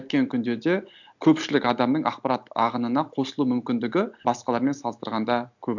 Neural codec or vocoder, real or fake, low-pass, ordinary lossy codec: none; real; 7.2 kHz; none